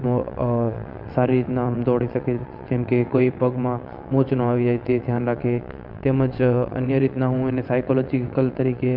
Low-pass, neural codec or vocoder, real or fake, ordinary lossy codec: 5.4 kHz; vocoder, 22.05 kHz, 80 mel bands, WaveNeXt; fake; none